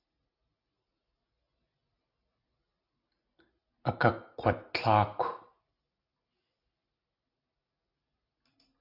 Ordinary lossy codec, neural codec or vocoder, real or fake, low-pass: AAC, 32 kbps; none; real; 5.4 kHz